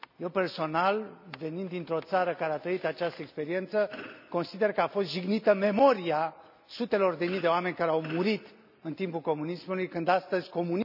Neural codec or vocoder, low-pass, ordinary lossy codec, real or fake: none; 5.4 kHz; none; real